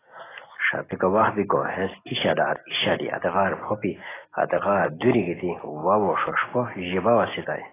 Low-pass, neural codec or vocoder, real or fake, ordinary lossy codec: 3.6 kHz; none; real; AAC, 16 kbps